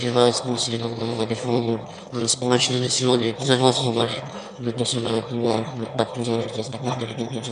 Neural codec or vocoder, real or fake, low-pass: autoencoder, 22.05 kHz, a latent of 192 numbers a frame, VITS, trained on one speaker; fake; 9.9 kHz